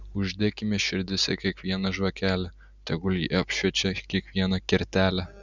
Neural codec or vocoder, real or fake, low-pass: none; real; 7.2 kHz